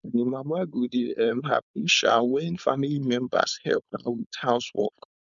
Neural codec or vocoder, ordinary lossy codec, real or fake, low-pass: codec, 16 kHz, 4.8 kbps, FACodec; none; fake; 7.2 kHz